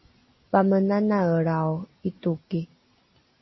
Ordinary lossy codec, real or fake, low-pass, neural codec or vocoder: MP3, 24 kbps; real; 7.2 kHz; none